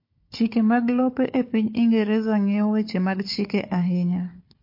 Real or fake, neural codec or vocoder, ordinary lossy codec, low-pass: fake; codec, 16 kHz, 4 kbps, FunCodec, trained on Chinese and English, 50 frames a second; MP3, 32 kbps; 5.4 kHz